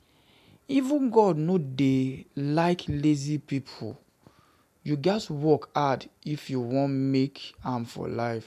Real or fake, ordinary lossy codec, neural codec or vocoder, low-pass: real; none; none; 14.4 kHz